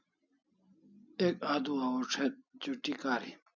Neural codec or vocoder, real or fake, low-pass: none; real; 7.2 kHz